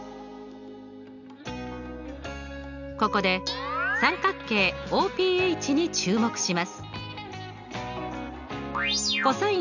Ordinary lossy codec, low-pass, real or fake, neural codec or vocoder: none; 7.2 kHz; real; none